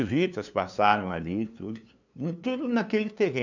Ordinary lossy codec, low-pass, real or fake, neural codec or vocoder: none; 7.2 kHz; fake; codec, 16 kHz, 2 kbps, FunCodec, trained on LibriTTS, 25 frames a second